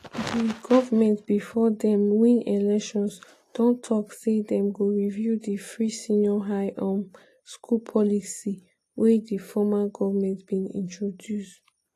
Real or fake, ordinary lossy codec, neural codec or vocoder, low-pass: real; AAC, 48 kbps; none; 14.4 kHz